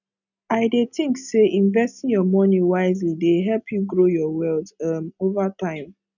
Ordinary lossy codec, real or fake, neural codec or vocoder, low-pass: none; real; none; 7.2 kHz